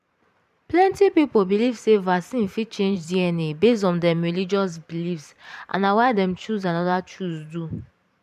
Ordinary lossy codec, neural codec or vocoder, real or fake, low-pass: none; vocoder, 44.1 kHz, 128 mel bands every 512 samples, BigVGAN v2; fake; 14.4 kHz